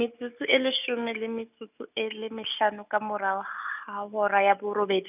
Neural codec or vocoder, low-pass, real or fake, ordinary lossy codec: none; 3.6 kHz; real; none